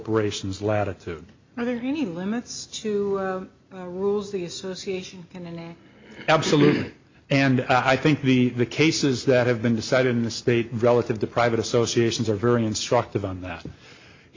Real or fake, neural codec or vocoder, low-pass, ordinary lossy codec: real; none; 7.2 kHz; MP3, 48 kbps